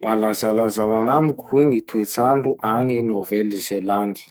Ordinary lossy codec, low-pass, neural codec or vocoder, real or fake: none; none; codec, 44.1 kHz, 2.6 kbps, SNAC; fake